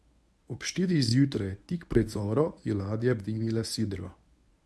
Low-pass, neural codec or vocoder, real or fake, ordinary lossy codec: none; codec, 24 kHz, 0.9 kbps, WavTokenizer, medium speech release version 1; fake; none